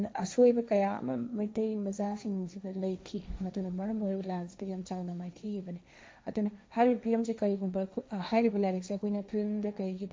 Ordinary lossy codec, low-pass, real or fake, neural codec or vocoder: none; none; fake; codec, 16 kHz, 1.1 kbps, Voila-Tokenizer